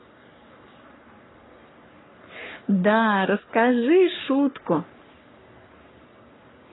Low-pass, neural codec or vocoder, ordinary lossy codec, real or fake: 7.2 kHz; codec, 44.1 kHz, 3.4 kbps, Pupu-Codec; AAC, 16 kbps; fake